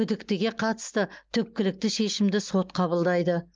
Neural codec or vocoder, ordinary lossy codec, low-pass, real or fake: none; Opus, 24 kbps; 7.2 kHz; real